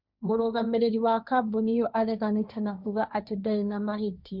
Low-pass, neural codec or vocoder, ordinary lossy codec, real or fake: 5.4 kHz; codec, 16 kHz, 1.1 kbps, Voila-Tokenizer; none; fake